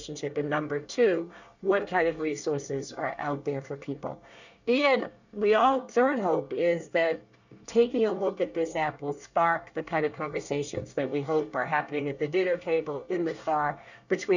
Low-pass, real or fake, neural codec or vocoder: 7.2 kHz; fake; codec, 24 kHz, 1 kbps, SNAC